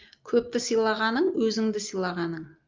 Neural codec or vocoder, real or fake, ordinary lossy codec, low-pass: none; real; Opus, 24 kbps; 7.2 kHz